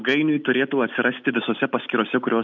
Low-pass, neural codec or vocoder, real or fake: 7.2 kHz; none; real